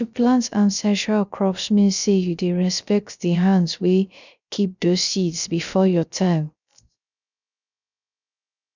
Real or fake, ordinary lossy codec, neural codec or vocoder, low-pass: fake; none; codec, 16 kHz, 0.3 kbps, FocalCodec; 7.2 kHz